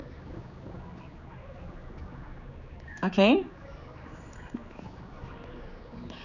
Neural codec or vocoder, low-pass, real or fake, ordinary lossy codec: codec, 16 kHz, 4 kbps, X-Codec, HuBERT features, trained on general audio; 7.2 kHz; fake; none